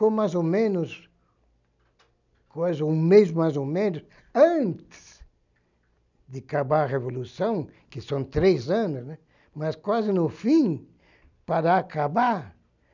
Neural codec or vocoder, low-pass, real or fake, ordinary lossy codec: none; 7.2 kHz; real; none